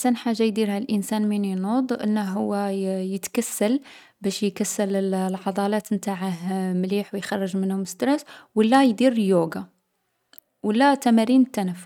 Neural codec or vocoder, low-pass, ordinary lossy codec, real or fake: none; 19.8 kHz; none; real